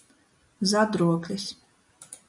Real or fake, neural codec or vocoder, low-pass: real; none; 10.8 kHz